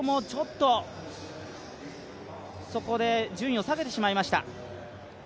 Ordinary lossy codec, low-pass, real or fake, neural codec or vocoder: none; none; real; none